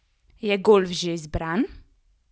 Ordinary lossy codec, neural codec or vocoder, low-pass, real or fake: none; none; none; real